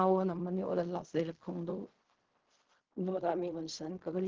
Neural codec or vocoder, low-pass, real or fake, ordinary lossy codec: codec, 16 kHz in and 24 kHz out, 0.4 kbps, LongCat-Audio-Codec, fine tuned four codebook decoder; 7.2 kHz; fake; Opus, 16 kbps